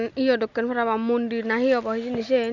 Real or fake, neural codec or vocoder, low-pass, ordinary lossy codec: real; none; 7.2 kHz; none